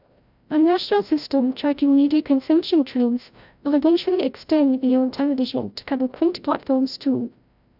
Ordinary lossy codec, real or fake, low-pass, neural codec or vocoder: none; fake; 5.4 kHz; codec, 16 kHz, 0.5 kbps, FreqCodec, larger model